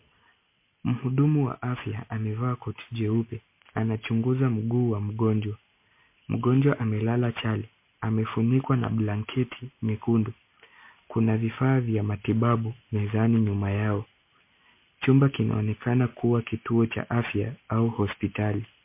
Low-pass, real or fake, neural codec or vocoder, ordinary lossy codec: 3.6 kHz; real; none; MP3, 24 kbps